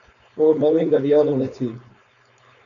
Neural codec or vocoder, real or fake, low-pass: codec, 16 kHz, 4.8 kbps, FACodec; fake; 7.2 kHz